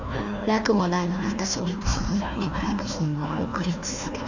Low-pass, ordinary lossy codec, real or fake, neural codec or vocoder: 7.2 kHz; none; fake; codec, 16 kHz, 1 kbps, FunCodec, trained on Chinese and English, 50 frames a second